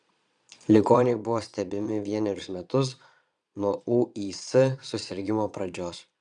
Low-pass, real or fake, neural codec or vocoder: 9.9 kHz; fake; vocoder, 22.05 kHz, 80 mel bands, Vocos